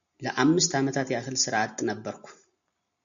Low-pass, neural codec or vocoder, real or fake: 7.2 kHz; none; real